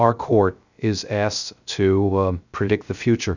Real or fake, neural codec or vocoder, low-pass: fake; codec, 16 kHz, 0.3 kbps, FocalCodec; 7.2 kHz